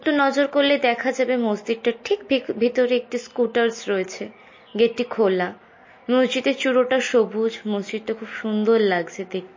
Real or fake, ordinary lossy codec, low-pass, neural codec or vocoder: real; MP3, 32 kbps; 7.2 kHz; none